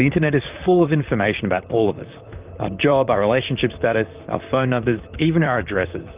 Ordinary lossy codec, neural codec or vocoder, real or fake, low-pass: Opus, 24 kbps; vocoder, 44.1 kHz, 128 mel bands, Pupu-Vocoder; fake; 3.6 kHz